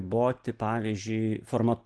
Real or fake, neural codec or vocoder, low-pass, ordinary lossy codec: real; none; 10.8 kHz; Opus, 16 kbps